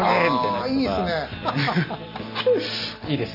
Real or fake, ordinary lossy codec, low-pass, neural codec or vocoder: real; none; 5.4 kHz; none